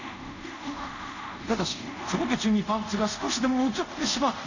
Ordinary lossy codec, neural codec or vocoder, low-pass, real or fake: none; codec, 24 kHz, 0.5 kbps, DualCodec; 7.2 kHz; fake